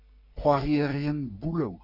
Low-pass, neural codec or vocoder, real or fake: 5.4 kHz; codec, 44.1 kHz, 3.4 kbps, Pupu-Codec; fake